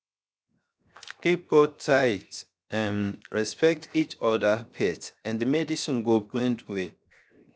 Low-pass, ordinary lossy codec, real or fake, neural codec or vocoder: none; none; fake; codec, 16 kHz, 0.7 kbps, FocalCodec